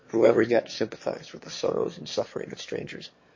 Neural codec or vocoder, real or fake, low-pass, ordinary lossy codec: autoencoder, 22.05 kHz, a latent of 192 numbers a frame, VITS, trained on one speaker; fake; 7.2 kHz; MP3, 32 kbps